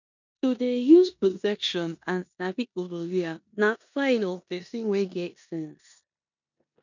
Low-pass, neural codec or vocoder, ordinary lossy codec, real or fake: 7.2 kHz; codec, 16 kHz in and 24 kHz out, 0.9 kbps, LongCat-Audio-Codec, four codebook decoder; none; fake